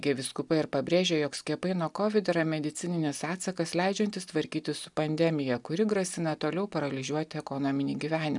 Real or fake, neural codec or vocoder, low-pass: real; none; 10.8 kHz